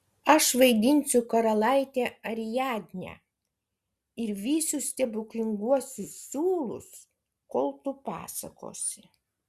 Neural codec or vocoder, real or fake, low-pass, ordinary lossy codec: none; real; 14.4 kHz; Opus, 64 kbps